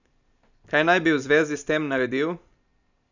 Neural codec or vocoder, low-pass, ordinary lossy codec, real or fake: none; 7.2 kHz; none; real